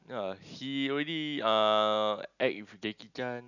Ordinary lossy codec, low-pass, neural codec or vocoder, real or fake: none; 7.2 kHz; none; real